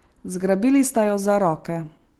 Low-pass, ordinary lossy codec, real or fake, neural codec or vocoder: 19.8 kHz; Opus, 16 kbps; real; none